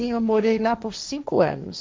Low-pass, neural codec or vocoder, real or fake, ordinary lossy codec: none; codec, 16 kHz, 1.1 kbps, Voila-Tokenizer; fake; none